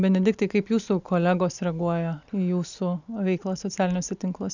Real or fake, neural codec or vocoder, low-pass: real; none; 7.2 kHz